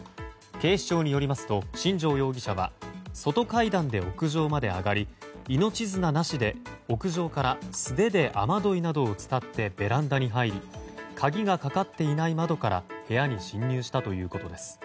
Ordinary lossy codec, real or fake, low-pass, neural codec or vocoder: none; real; none; none